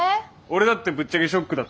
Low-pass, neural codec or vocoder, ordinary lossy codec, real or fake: none; none; none; real